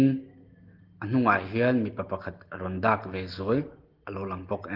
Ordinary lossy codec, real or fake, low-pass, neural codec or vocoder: Opus, 16 kbps; real; 5.4 kHz; none